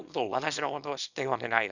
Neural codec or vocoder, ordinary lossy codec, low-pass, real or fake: codec, 24 kHz, 0.9 kbps, WavTokenizer, small release; none; 7.2 kHz; fake